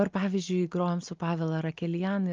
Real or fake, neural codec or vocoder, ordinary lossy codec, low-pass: real; none; Opus, 24 kbps; 7.2 kHz